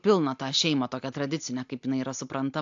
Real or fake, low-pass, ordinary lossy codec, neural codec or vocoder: real; 7.2 kHz; AAC, 64 kbps; none